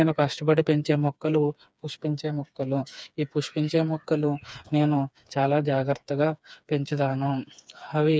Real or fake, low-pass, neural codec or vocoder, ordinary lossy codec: fake; none; codec, 16 kHz, 4 kbps, FreqCodec, smaller model; none